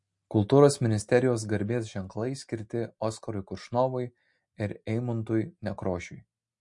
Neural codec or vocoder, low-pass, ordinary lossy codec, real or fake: none; 10.8 kHz; MP3, 48 kbps; real